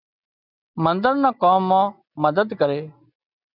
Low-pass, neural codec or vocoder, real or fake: 5.4 kHz; none; real